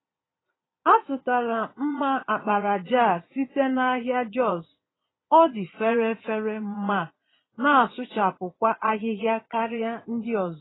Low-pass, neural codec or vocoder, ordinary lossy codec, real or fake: 7.2 kHz; vocoder, 22.05 kHz, 80 mel bands, Vocos; AAC, 16 kbps; fake